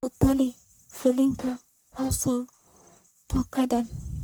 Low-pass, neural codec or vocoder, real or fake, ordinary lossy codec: none; codec, 44.1 kHz, 1.7 kbps, Pupu-Codec; fake; none